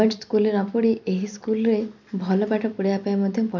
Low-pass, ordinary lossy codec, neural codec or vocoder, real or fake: 7.2 kHz; none; none; real